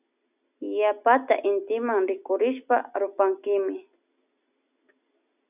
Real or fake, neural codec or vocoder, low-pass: real; none; 3.6 kHz